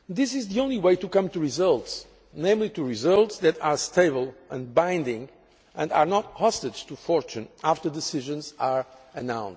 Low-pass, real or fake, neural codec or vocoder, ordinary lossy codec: none; real; none; none